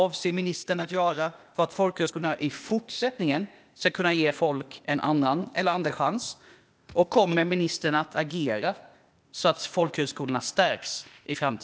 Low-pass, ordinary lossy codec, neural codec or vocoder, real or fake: none; none; codec, 16 kHz, 0.8 kbps, ZipCodec; fake